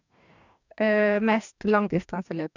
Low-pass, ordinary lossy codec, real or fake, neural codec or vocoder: 7.2 kHz; none; fake; codec, 44.1 kHz, 2.6 kbps, DAC